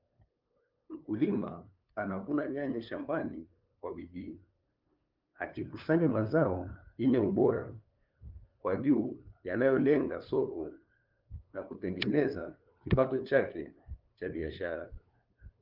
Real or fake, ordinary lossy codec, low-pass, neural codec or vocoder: fake; Opus, 24 kbps; 5.4 kHz; codec, 16 kHz, 2 kbps, FunCodec, trained on LibriTTS, 25 frames a second